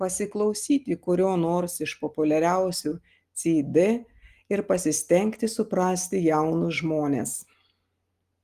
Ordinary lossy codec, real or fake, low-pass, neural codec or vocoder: Opus, 24 kbps; real; 14.4 kHz; none